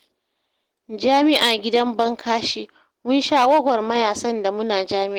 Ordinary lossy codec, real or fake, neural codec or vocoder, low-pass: Opus, 16 kbps; real; none; 19.8 kHz